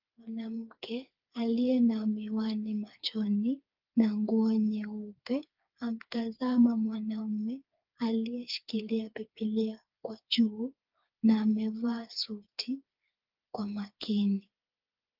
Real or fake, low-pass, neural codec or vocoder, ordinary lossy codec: fake; 5.4 kHz; codec, 16 kHz, 4 kbps, FreqCodec, smaller model; Opus, 24 kbps